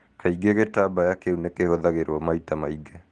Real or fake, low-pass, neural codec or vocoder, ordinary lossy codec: real; 10.8 kHz; none; Opus, 16 kbps